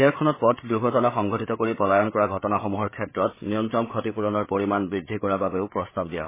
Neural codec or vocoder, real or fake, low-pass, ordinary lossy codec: codec, 16 kHz, 8 kbps, FreqCodec, larger model; fake; 3.6 kHz; MP3, 16 kbps